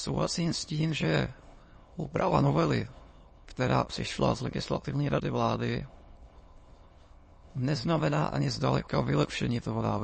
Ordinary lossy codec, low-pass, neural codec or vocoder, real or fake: MP3, 32 kbps; 9.9 kHz; autoencoder, 22.05 kHz, a latent of 192 numbers a frame, VITS, trained on many speakers; fake